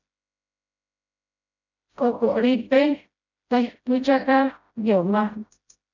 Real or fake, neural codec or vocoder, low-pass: fake; codec, 16 kHz, 0.5 kbps, FreqCodec, smaller model; 7.2 kHz